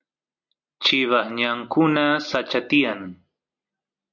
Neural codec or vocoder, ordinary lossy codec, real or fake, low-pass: none; MP3, 64 kbps; real; 7.2 kHz